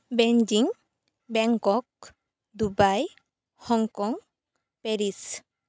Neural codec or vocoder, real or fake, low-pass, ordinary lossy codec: none; real; none; none